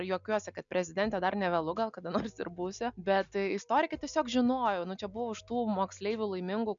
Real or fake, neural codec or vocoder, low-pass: real; none; 7.2 kHz